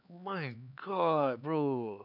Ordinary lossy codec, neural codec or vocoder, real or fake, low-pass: none; codec, 16 kHz, 4 kbps, X-Codec, HuBERT features, trained on LibriSpeech; fake; 5.4 kHz